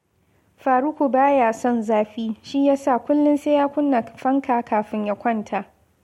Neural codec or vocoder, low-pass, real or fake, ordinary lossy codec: none; 19.8 kHz; real; MP3, 64 kbps